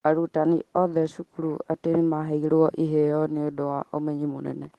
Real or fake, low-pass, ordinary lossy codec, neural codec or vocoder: real; 19.8 kHz; Opus, 16 kbps; none